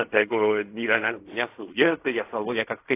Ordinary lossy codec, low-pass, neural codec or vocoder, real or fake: AAC, 24 kbps; 3.6 kHz; codec, 16 kHz in and 24 kHz out, 0.4 kbps, LongCat-Audio-Codec, fine tuned four codebook decoder; fake